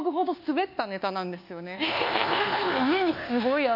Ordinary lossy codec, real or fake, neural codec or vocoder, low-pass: none; fake; codec, 24 kHz, 1.2 kbps, DualCodec; 5.4 kHz